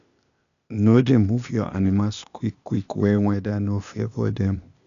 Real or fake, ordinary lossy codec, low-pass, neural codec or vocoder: fake; none; 7.2 kHz; codec, 16 kHz, 0.8 kbps, ZipCodec